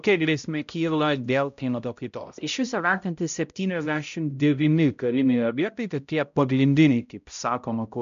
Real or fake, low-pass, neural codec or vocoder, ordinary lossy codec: fake; 7.2 kHz; codec, 16 kHz, 0.5 kbps, X-Codec, HuBERT features, trained on balanced general audio; MP3, 64 kbps